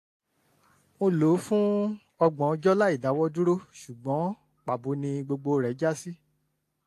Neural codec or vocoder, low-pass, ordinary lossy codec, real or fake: none; 14.4 kHz; AAC, 64 kbps; real